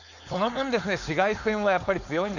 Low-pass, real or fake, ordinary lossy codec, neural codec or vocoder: 7.2 kHz; fake; none; codec, 16 kHz, 4.8 kbps, FACodec